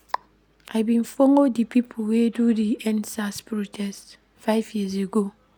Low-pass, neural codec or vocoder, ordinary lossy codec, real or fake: none; none; none; real